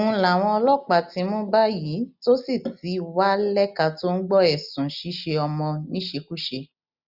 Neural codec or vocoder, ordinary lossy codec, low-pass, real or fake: none; none; 5.4 kHz; real